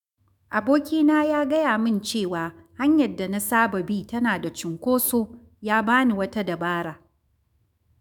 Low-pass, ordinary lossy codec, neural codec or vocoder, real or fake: 19.8 kHz; none; autoencoder, 48 kHz, 128 numbers a frame, DAC-VAE, trained on Japanese speech; fake